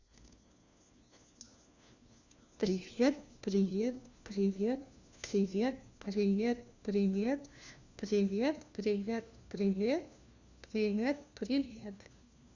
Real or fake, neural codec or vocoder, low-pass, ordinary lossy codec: fake; codec, 16 kHz, 1 kbps, FunCodec, trained on LibriTTS, 50 frames a second; 7.2 kHz; Opus, 64 kbps